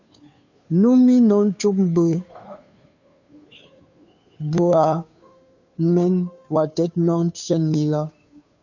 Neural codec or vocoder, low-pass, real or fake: codec, 16 kHz, 2 kbps, FunCodec, trained on Chinese and English, 25 frames a second; 7.2 kHz; fake